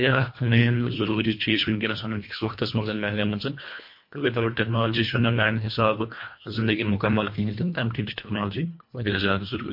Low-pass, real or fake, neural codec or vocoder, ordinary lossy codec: 5.4 kHz; fake; codec, 24 kHz, 1.5 kbps, HILCodec; MP3, 32 kbps